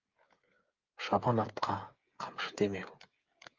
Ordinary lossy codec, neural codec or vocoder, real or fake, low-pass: Opus, 32 kbps; codec, 16 kHz, 8 kbps, FreqCodec, smaller model; fake; 7.2 kHz